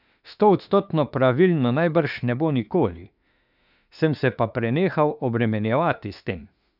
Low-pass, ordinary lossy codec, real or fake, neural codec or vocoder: 5.4 kHz; none; fake; autoencoder, 48 kHz, 32 numbers a frame, DAC-VAE, trained on Japanese speech